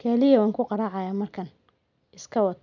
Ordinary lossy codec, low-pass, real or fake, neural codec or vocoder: none; 7.2 kHz; real; none